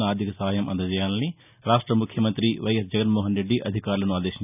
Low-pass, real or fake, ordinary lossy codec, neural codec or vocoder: 3.6 kHz; real; none; none